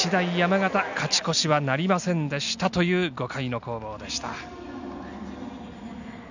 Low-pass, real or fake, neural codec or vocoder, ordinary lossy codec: 7.2 kHz; real; none; none